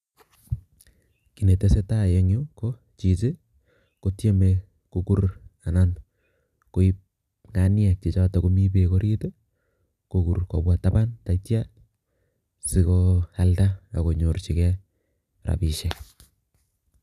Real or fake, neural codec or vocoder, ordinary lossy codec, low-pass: real; none; none; 14.4 kHz